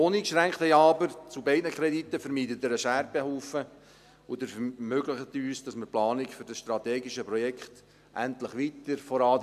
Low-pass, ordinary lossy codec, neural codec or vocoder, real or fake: 14.4 kHz; none; none; real